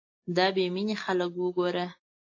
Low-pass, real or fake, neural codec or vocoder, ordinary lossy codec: 7.2 kHz; real; none; AAC, 32 kbps